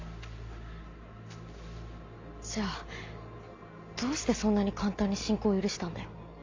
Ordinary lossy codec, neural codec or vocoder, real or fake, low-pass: AAC, 48 kbps; none; real; 7.2 kHz